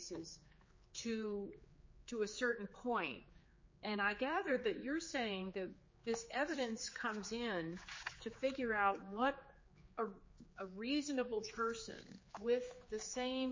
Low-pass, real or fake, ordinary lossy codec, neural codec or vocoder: 7.2 kHz; fake; MP3, 32 kbps; codec, 16 kHz, 4 kbps, X-Codec, HuBERT features, trained on general audio